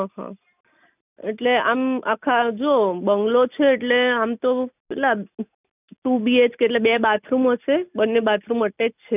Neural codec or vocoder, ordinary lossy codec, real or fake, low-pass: none; none; real; 3.6 kHz